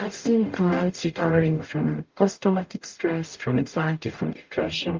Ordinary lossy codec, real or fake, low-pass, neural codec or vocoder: Opus, 32 kbps; fake; 7.2 kHz; codec, 44.1 kHz, 0.9 kbps, DAC